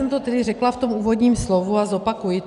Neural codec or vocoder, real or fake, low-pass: none; real; 10.8 kHz